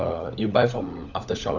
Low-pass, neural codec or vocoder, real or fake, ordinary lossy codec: 7.2 kHz; codec, 16 kHz, 16 kbps, FunCodec, trained on LibriTTS, 50 frames a second; fake; none